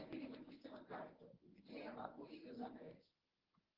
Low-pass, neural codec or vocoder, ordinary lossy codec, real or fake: 5.4 kHz; codec, 24 kHz, 0.9 kbps, WavTokenizer, medium speech release version 1; Opus, 16 kbps; fake